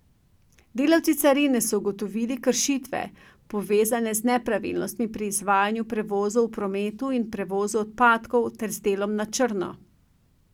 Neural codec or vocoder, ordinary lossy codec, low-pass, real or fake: none; none; 19.8 kHz; real